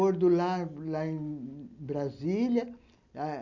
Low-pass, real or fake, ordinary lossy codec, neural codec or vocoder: 7.2 kHz; real; none; none